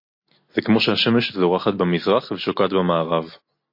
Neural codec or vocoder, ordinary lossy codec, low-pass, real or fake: none; MP3, 32 kbps; 5.4 kHz; real